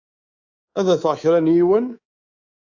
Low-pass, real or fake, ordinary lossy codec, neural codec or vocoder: 7.2 kHz; fake; AAC, 48 kbps; codec, 24 kHz, 3.1 kbps, DualCodec